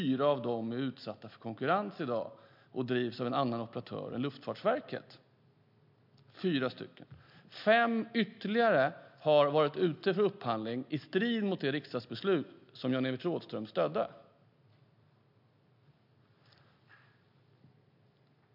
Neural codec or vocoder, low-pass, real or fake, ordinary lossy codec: none; 5.4 kHz; real; MP3, 48 kbps